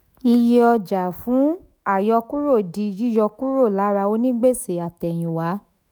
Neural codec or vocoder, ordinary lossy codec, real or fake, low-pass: autoencoder, 48 kHz, 128 numbers a frame, DAC-VAE, trained on Japanese speech; none; fake; none